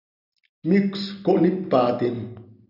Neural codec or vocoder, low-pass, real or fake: none; 5.4 kHz; real